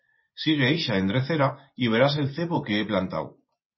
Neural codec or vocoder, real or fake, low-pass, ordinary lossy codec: vocoder, 24 kHz, 100 mel bands, Vocos; fake; 7.2 kHz; MP3, 24 kbps